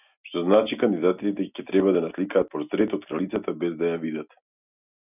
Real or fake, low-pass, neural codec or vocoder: real; 3.6 kHz; none